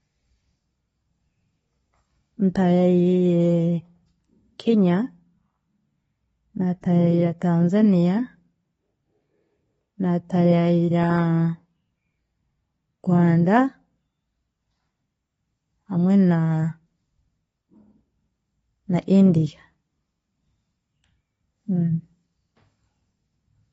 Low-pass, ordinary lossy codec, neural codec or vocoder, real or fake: 19.8 kHz; AAC, 24 kbps; none; real